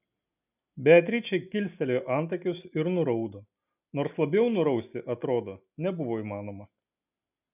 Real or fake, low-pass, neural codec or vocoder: real; 3.6 kHz; none